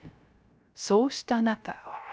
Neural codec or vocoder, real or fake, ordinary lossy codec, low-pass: codec, 16 kHz, 0.3 kbps, FocalCodec; fake; none; none